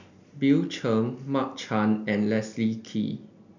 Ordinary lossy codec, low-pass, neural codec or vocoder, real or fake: none; 7.2 kHz; none; real